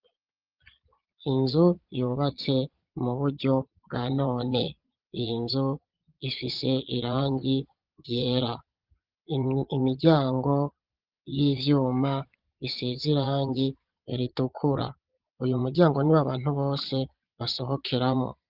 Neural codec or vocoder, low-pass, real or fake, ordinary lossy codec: vocoder, 22.05 kHz, 80 mel bands, Vocos; 5.4 kHz; fake; Opus, 24 kbps